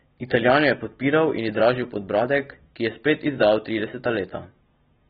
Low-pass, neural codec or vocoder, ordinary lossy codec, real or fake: 19.8 kHz; none; AAC, 16 kbps; real